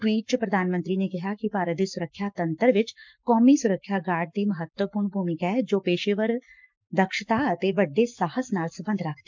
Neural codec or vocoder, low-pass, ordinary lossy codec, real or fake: codec, 44.1 kHz, 7.8 kbps, Pupu-Codec; 7.2 kHz; MP3, 64 kbps; fake